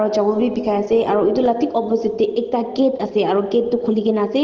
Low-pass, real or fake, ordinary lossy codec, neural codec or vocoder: 7.2 kHz; real; Opus, 16 kbps; none